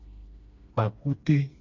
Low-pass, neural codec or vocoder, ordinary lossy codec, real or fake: 7.2 kHz; codec, 16 kHz, 2 kbps, FreqCodec, smaller model; MP3, 48 kbps; fake